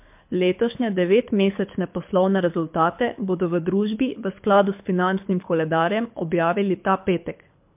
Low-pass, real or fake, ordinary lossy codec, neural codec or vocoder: 3.6 kHz; fake; MP3, 32 kbps; codec, 24 kHz, 6 kbps, HILCodec